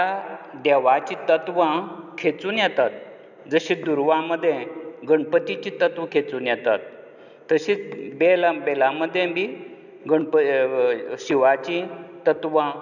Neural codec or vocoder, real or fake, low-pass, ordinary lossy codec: none; real; 7.2 kHz; none